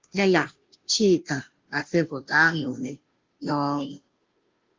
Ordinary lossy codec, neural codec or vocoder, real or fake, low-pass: Opus, 24 kbps; codec, 16 kHz, 0.5 kbps, FunCodec, trained on Chinese and English, 25 frames a second; fake; 7.2 kHz